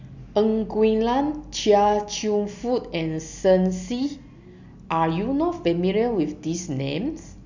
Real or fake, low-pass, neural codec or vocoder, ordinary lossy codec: real; 7.2 kHz; none; none